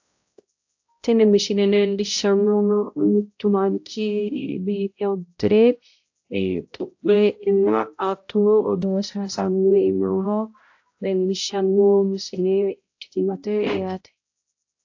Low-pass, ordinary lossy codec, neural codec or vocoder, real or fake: 7.2 kHz; AAC, 48 kbps; codec, 16 kHz, 0.5 kbps, X-Codec, HuBERT features, trained on balanced general audio; fake